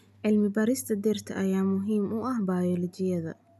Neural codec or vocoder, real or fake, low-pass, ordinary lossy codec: none; real; 14.4 kHz; none